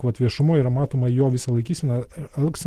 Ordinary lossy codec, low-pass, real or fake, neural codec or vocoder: Opus, 16 kbps; 14.4 kHz; fake; vocoder, 44.1 kHz, 128 mel bands every 512 samples, BigVGAN v2